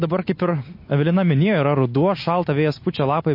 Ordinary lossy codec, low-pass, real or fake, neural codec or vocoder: MP3, 32 kbps; 5.4 kHz; real; none